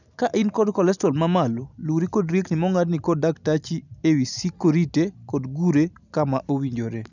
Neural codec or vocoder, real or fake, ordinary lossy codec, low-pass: none; real; none; 7.2 kHz